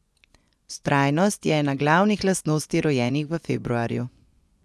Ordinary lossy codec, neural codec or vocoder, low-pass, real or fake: none; vocoder, 24 kHz, 100 mel bands, Vocos; none; fake